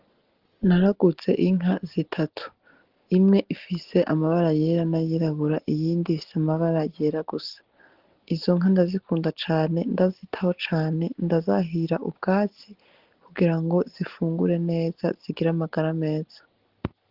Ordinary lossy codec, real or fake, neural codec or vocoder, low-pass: Opus, 16 kbps; real; none; 5.4 kHz